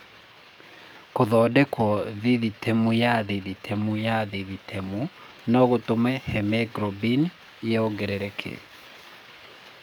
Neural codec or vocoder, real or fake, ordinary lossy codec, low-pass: codec, 44.1 kHz, 7.8 kbps, DAC; fake; none; none